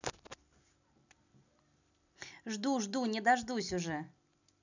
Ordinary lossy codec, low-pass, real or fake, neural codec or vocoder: none; 7.2 kHz; real; none